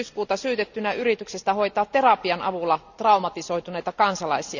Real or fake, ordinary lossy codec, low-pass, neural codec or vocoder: real; none; 7.2 kHz; none